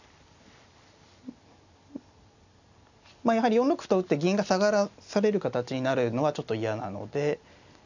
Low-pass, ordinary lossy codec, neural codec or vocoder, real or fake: 7.2 kHz; none; none; real